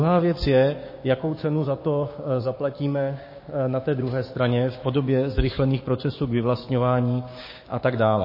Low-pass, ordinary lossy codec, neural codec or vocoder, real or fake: 5.4 kHz; MP3, 24 kbps; codec, 16 kHz, 6 kbps, DAC; fake